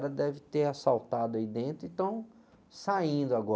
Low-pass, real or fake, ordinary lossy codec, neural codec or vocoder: none; real; none; none